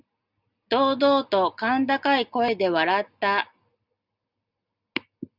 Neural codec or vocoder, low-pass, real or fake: none; 5.4 kHz; real